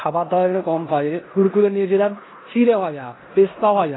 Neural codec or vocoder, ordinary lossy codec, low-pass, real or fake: codec, 16 kHz in and 24 kHz out, 0.9 kbps, LongCat-Audio-Codec, four codebook decoder; AAC, 16 kbps; 7.2 kHz; fake